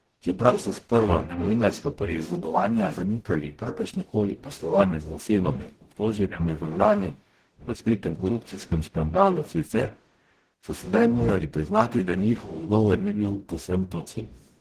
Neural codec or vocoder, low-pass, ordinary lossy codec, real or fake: codec, 44.1 kHz, 0.9 kbps, DAC; 14.4 kHz; Opus, 16 kbps; fake